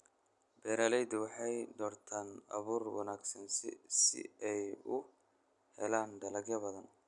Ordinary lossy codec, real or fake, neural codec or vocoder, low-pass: none; real; none; 10.8 kHz